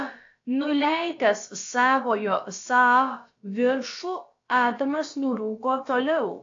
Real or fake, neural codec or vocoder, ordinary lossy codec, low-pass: fake; codec, 16 kHz, about 1 kbps, DyCAST, with the encoder's durations; AAC, 48 kbps; 7.2 kHz